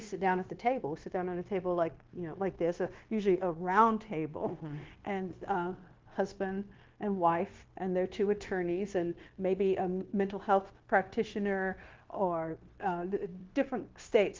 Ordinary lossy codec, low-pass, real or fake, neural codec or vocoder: Opus, 16 kbps; 7.2 kHz; fake; codec, 16 kHz, 0.9 kbps, LongCat-Audio-Codec